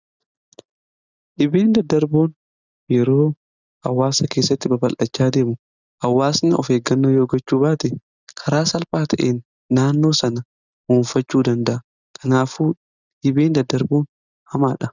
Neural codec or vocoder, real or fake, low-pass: none; real; 7.2 kHz